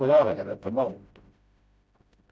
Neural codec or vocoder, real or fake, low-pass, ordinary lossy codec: codec, 16 kHz, 0.5 kbps, FreqCodec, smaller model; fake; none; none